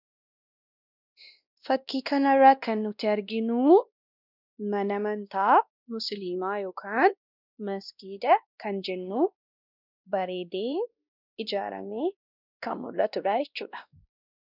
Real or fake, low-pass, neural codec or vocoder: fake; 5.4 kHz; codec, 16 kHz, 1 kbps, X-Codec, WavLM features, trained on Multilingual LibriSpeech